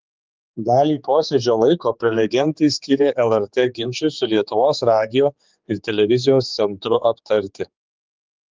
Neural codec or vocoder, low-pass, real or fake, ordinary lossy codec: codec, 16 kHz, 4 kbps, X-Codec, HuBERT features, trained on general audio; 7.2 kHz; fake; Opus, 32 kbps